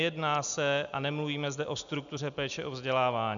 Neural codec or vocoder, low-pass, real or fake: none; 7.2 kHz; real